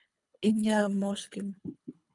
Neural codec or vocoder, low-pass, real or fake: codec, 24 kHz, 3 kbps, HILCodec; 10.8 kHz; fake